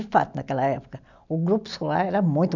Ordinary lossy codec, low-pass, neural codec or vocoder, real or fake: none; 7.2 kHz; none; real